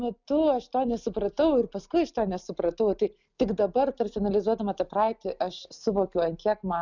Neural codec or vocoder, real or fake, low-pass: none; real; 7.2 kHz